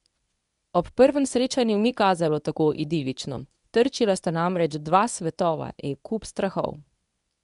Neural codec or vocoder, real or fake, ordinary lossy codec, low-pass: codec, 24 kHz, 0.9 kbps, WavTokenizer, medium speech release version 1; fake; none; 10.8 kHz